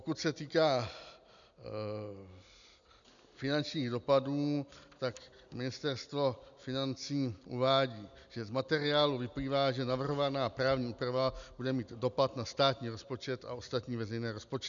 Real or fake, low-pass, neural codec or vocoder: real; 7.2 kHz; none